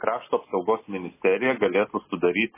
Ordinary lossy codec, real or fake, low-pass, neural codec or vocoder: MP3, 16 kbps; real; 3.6 kHz; none